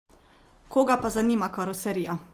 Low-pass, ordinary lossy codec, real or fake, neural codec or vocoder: 14.4 kHz; Opus, 24 kbps; real; none